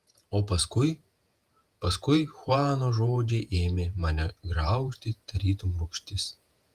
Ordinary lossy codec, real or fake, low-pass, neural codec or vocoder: Opus, 32 kbps; fake; 14.4 kHz; vocoder, 48 kHz, 128 mel bands, Vocos